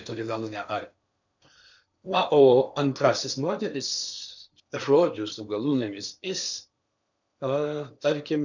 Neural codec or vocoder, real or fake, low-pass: codec, 16 kHz in and 24 kHz out, 0.8 kbps, FocalCodec, streaming, 65536 codes; fake; 7.2 kHz